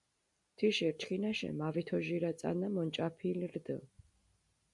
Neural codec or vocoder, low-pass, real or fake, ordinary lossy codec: none; 10.8 kHz; real; MP3, 64 kbps